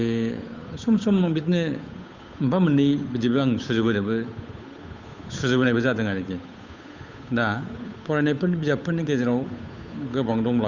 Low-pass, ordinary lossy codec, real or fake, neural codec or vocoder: 7.2 kHz; Opus, 64 kbps; fake; codec, 16 kHz, 8 kbps, FunCodec, trained on Chinese and English, 25 frames a second